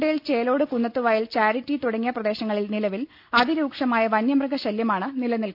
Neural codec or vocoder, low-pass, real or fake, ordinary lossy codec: none; 5.4 kHz; real; AAC, 48 kbps